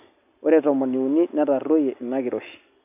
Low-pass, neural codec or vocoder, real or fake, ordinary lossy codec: 3.6 kHz; none; real; none